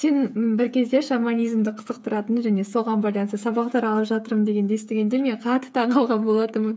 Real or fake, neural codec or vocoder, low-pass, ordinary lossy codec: fake; codec, 16 kHz, 8 kbps, FreqCodec, smaller model; none; none